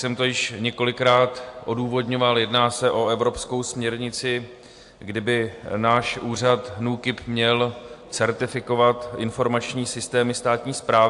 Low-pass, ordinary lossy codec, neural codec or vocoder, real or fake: 10.8 kHz; AAC, 64 kbps; none; real